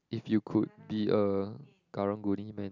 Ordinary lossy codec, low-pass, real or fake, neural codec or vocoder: none; 7.2 kHz; real; none